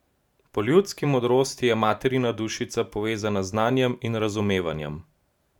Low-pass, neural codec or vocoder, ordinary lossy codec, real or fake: 19.8 kHz; vocoder, 44.1 kHz, 128 mel bands every 512 samples, BigVGAN v2; none; fake